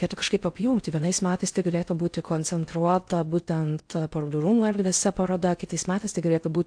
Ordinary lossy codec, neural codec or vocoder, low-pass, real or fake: AAC, 64 kbps; codec, 16 kHz in and 24 kHz out, 0.6 kbps, FocalCodec, streaming, 4096 codes; 9.9 kHz; fake